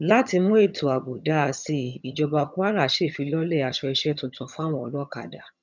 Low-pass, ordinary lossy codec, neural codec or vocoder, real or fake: 7.2 kHz; none; vocoder, 22.05 kHz, 80 mel bands, HiFi-GAN; fake